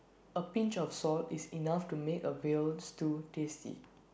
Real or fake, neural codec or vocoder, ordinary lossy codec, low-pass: real; none; none; none